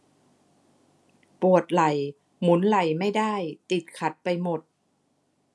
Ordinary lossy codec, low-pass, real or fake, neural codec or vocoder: none; none; real; none